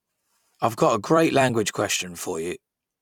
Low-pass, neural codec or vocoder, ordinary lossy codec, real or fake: 19.8 kHz; vocoder, 44.1 kHz, 128 mel bands every 256 samples, BigVGAN v2; none; fake